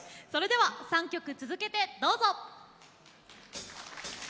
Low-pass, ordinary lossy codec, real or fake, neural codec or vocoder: none; none; real; none